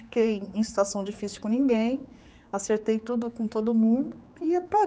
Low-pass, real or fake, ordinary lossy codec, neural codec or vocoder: none; fake; none; codec, 16 kHz, 4 kbps, X-Codec, HuBERT features, trained on general audio